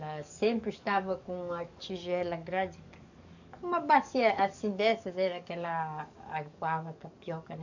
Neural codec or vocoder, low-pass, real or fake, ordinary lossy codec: codec, 44.1 kHz, 7.8 kbps, DAC; 7.2 kHz; fake; none